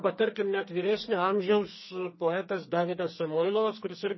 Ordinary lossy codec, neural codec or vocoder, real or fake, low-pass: MP3, 24 kbps; codec, 44.1 kHz, 2.6 kbps, SNAC; fake; 7.2 kHz